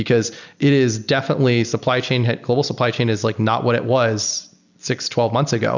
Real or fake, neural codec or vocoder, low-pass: real; none; 7.2 kHz